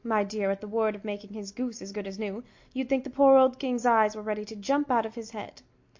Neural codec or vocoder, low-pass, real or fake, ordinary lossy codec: none; 7.2 kHz; real; MP3, 48 kbps